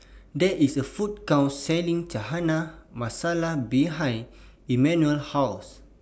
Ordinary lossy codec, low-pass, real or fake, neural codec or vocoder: none; none; real; none